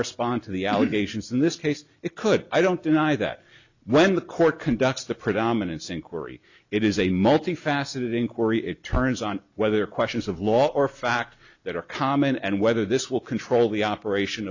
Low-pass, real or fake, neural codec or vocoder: 7.2 kHz; real; none